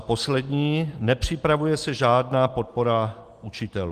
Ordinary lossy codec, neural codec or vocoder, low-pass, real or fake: Opus, 24 kbps; none; 14.4 kHz; real